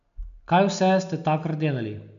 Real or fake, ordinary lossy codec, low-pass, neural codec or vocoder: real; MP3, 96 kbps; 7.2 kHz; none